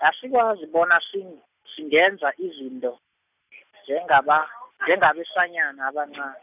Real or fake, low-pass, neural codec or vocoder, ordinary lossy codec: real; 3.6 kHz; none; none